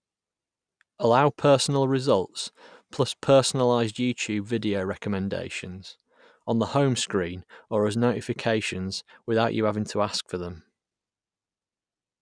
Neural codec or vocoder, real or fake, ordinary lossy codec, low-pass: none; real; none; 9.9 kHz